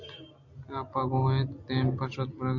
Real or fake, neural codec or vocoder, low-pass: real; none; 7.2 kHz